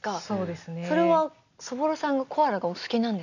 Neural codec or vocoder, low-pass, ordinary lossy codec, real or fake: none; 7.2 kHz; none; real